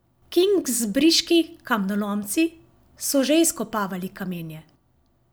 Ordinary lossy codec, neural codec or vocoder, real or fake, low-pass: none; none; real; none